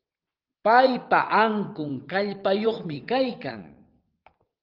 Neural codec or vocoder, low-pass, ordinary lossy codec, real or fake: vocoder, 22.05 kHz, 80 mel bands, WaveNeXt; 5.4 kHz; Opus, 32 kbps; fake